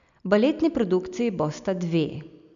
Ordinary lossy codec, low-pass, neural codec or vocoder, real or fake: MP3, 96 kbps; 7.2 kHz; none; real